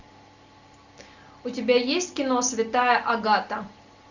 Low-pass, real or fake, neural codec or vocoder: 7.2 kHz; real; none